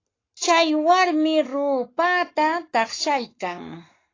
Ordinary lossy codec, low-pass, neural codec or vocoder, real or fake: AAC, 32 kbps; 7.2 kHz; codec, 44.1 kHz, 7.8 kbps, Pupu-Codec; fake